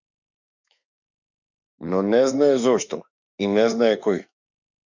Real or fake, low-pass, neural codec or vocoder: fake; 7.2 kHz; autoencoder, 48 kHz, 32 numbers a frame, DAC-VAE, trained on Japanese speech